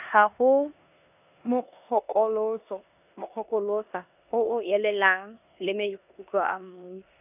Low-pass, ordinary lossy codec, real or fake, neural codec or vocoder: 3.6 kHz; none; fake; codec, 16 kHz in and 24 kHz out, 0.9 kbps, LongCat-Audio-Codec, four codebook decoder